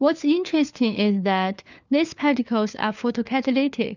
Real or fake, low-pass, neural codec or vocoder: fake; 7.2 kHz; codec, 16 kHz, 4 kbps, FunCodec, trained on LibriTTS, 50 frames a second